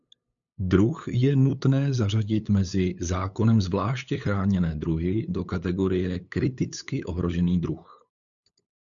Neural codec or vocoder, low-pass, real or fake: codec, 16 kHz, 8 kbps, FunCodec, trained on LibriTTS, 25 frames a second; 7.2 kHz; fake